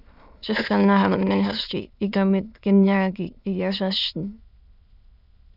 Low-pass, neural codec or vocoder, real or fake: 5.4 kHz; autoencoder, 22.05 kHz, a latent of 192 numbers a frame, VITS, trained on many speakers; fake